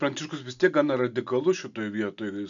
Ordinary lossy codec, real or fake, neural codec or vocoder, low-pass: MP3, 64 kbps; real; none; 7.2 kHz